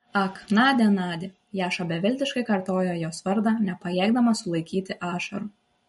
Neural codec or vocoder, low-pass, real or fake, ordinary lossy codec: none; 19.8 kHz; real; MP3, 48 kbps